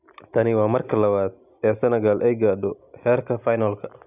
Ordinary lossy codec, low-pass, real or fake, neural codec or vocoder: none; 3.6 kHz; real; none